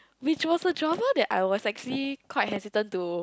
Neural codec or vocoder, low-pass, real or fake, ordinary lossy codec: none; none; real; none